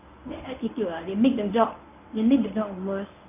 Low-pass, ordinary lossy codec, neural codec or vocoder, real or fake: 3.6 kHz; none; codec, 24 kHz, 0.9 kbps, WavTokenizer, medium speech release version 1; fake